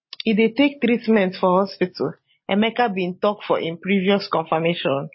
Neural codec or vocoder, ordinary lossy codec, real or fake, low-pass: none; MP3, 24 kbps; real; 7.2 kHz